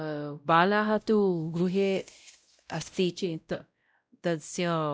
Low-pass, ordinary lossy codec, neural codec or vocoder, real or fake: none; none; codec, 16 kHz, 0.5 kbps, X-Codec, WavLM features, trained on Multilingual LibriSpeech; fake